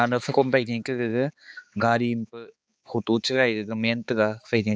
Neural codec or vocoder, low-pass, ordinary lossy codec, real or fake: codec, 16 kHz, 4 kbps, X-Codec, HuBERT features, trained on balanced general audio; none; none; fake